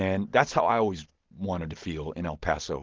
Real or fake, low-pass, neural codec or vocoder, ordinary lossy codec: real; 7.2 kHz; none; Opus, 16 kbps